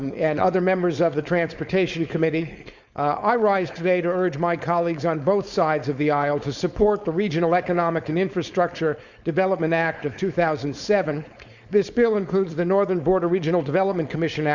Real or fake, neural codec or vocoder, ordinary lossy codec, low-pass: fake; codec, 16 kHz, 4.8 kbps, FACodec; Opus, 64 kbps; 7.2 kHz